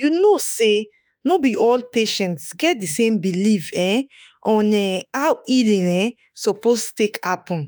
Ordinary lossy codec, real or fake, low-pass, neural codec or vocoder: none; fake; none; autoencoder, 48 kHz, 32 numbers a frame, DAC-VAE, trained on Japanese speech